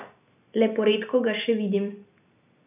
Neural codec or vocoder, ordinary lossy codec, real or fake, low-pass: none; none; real; 3.6 kHz